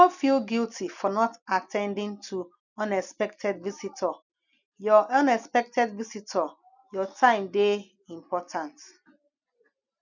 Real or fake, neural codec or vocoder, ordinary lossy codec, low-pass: real; none; none; 7.2 kHz